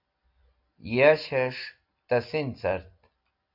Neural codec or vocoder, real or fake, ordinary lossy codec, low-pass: none; real; AAC, 48 kbps; 5.4 kHz